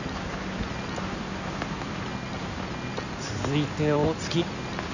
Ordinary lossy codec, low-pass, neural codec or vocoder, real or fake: none; 7.2 kHz; codec, 16 kHz in and 24 kHz out, 2.2 kbps, FireRedTTS-2 codec; fake